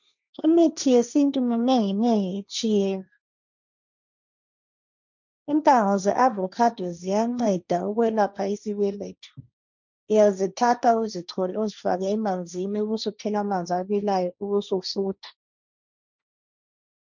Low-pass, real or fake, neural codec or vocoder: 7.2 kHz; fake; codec, 16 kHz, 1.1 kbps, Voila-Tokenizer